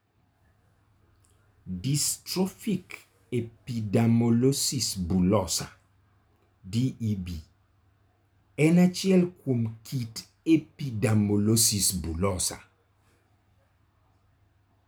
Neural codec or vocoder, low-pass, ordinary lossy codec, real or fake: none; none; none; real